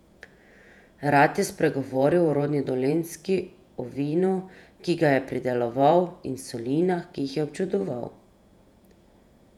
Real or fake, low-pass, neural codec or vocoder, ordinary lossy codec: fake; 19.8 kHz; vocoder, 48 kHz, 128 mel bands, Vocos; none